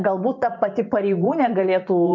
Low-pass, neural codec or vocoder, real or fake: 7.2 kHz; vocoder, 24 kHz, 100 mel bands, Vocos; fake